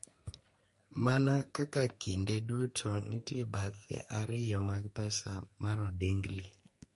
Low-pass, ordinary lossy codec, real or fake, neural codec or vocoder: 14.4 kHz; MP3, 48 kbps; fake; codec, 44.1 kHz, 2.6 kbps, SNAC